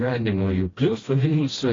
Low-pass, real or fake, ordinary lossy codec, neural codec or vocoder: 7.2 kHz; fake; AAC, 32 kbps; codec, 16 kHz, 1 kbps, FreqCodec, smaller model